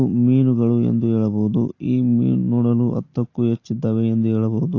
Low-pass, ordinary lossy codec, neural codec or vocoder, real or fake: 7.2 kHz; AAC, 32 kbps; none; real